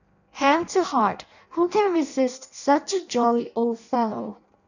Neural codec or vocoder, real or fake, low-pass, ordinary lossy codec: codec, 16 kHz in and 24 kHz out, 0.6 kbps, FireRedTTS-2 codec; fake; 7.2 kHz; none